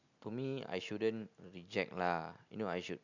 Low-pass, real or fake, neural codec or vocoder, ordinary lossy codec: 7.2 kHz; real; none; none